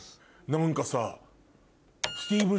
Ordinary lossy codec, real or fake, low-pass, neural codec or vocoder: none; real; none; none